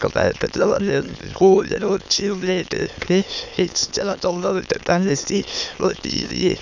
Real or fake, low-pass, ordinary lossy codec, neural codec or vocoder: fake; 7.2 kHz; none; autoencoder, 22.05 kHz, a latent of 192 numbers a frame, VITS, trained on many speakers